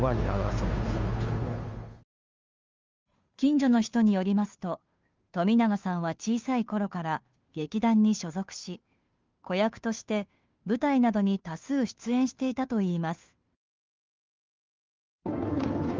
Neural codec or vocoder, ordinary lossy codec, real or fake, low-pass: codec, 16 kHz, 2 kbps, FunCodec, trained on Chinese and English, 25 frames a second; Opus, 32 kbps; fake; 7.2 kHz